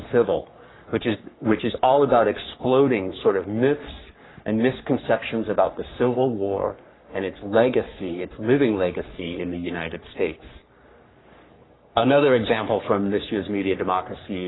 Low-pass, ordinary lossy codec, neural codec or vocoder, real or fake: 7.2 kHz; AAC, 16 kbps; codec, 44.1 kHz, 3.4 kbps, Pupu-Codec; fake